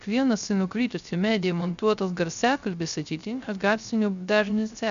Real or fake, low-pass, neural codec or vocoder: fake; 7.2 kHz; codec, 16 kHz, 0.3 kbps, FocalCodec